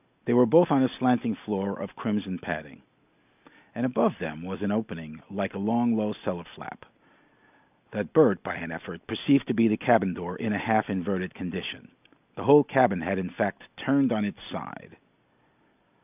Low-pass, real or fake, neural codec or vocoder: 3.6 kHz; real; none